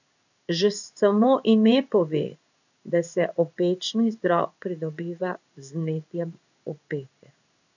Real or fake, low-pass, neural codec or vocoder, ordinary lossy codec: fake; 7.2 kHz; codec, 16 kHz in and 24 kHz out, 1 kbps, XY-Tokenizer; none